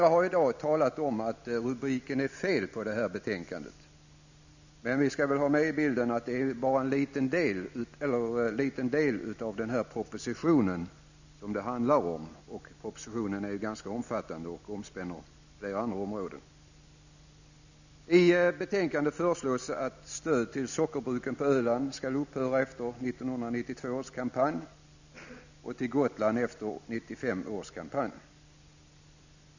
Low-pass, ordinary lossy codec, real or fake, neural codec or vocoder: 7.2 kHz; none; real; none